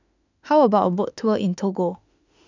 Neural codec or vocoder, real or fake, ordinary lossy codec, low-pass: autoencoder, 48 kHz, 32 numbers a frame, DAC-VAE, trained on Japanese speech; fake; none; 7.2 kHz